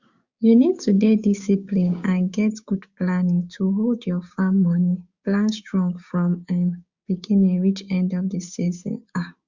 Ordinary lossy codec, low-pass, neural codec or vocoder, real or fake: Opus, 64 kbps; 7.2 kHz; codec, 16 kHz, 6 kbps, DAC; fake